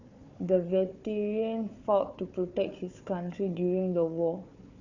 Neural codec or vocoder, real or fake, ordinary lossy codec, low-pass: codec, 16 kHz, 4 kbps, FunCodec, trained on Chinese and English, 50 frames a second; fake; Opus, 64 kbps; 7.2 kHz